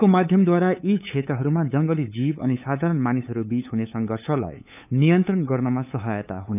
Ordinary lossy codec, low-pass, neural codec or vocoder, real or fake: none; 3.6 kHz; codec, 16 kHz, 8 kbps, FunCodec, trained on LibriTTS, 25 frames a second; fake